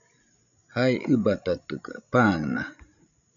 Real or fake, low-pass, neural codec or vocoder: fake; 7.2 kHz; codec, 16 kHz, 16 kbps, FreqCodec, larger model